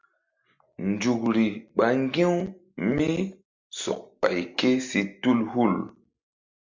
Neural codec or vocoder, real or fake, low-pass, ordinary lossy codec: none; real; 7.2 kHz; MP3, 64 kbps